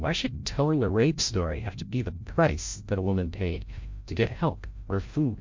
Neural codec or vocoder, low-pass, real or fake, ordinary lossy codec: codec, 16 kHz, 0.5 kbps, FreqCodec, larger model; 7.2 kHz; fake; MP3, 48 kbps